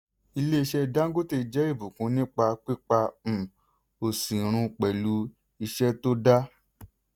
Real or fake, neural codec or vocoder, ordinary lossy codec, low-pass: real; none; none; none